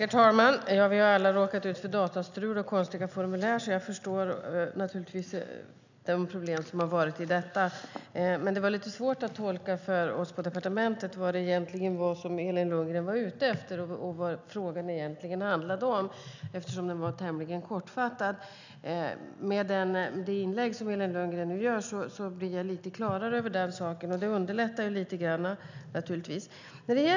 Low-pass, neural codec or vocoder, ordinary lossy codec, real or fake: 7.2 kHz; none; none; real